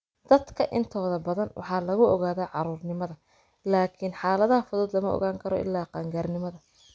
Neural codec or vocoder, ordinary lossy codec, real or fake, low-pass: none; none; real; none